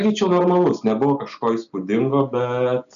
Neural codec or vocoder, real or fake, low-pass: none; real; 7.2 kHz